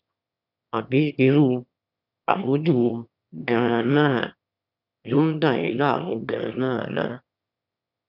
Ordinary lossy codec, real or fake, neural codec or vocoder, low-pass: none; fake; autoencoder, 22.05 kHz, a latent of 192 numbers a frame, VITS, trained on one speaker; 5.4 kHz